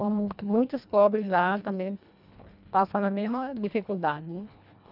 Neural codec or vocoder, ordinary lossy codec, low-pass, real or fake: codec, 24 kHz, 1.5 kbps, HILCodec; none; 5.4 kHz; fake